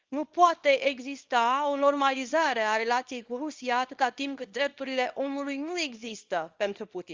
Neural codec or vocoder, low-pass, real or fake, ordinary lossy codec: codec, 24 kHz, 0.9 kbps, WavTokenizer, small release; 7.2 kHz; fake; Opus, 32 kbps